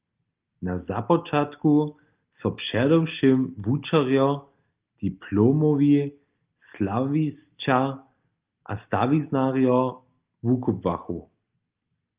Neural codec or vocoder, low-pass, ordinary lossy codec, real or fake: none; 3.6 kHz; Opus, 32 kbps; real